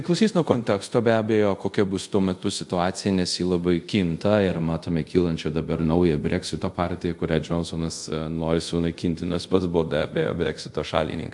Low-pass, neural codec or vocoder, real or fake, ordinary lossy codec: 10.8 kHz; codec, 24 kHz, 0.5 kbps, DualCodec; fake; MP3, 64 kbps